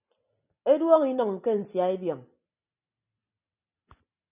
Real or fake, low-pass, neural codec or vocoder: real; 3.6 kHz; none